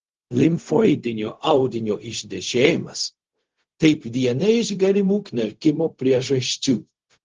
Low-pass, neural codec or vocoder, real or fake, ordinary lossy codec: 7.2 kHz; codec, 16 kHz, 0.4 kbps, LongCat-Audio-Codec; fake; Opus, 16 kbps